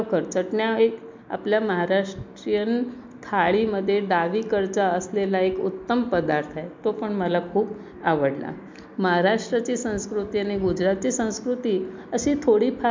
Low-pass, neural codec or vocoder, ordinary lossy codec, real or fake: 7.2 kHz; none; MP3, 64 kbps; real